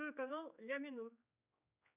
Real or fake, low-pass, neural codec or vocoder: fake; 3.6 kHz; codec, 16 kHz, 4 kbps, X-Codec, HuBERT features, trained on general audio